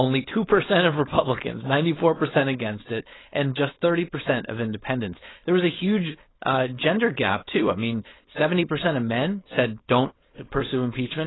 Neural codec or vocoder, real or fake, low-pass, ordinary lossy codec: codec, 16 kHz, 4.8 kbps, FACodec; fake; 7.2 kHz; AAC, 16 kbps